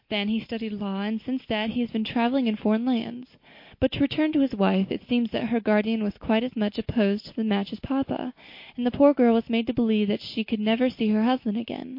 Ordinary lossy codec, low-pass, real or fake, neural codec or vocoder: MP3, 32 kbps; 5.4 kHz; real; none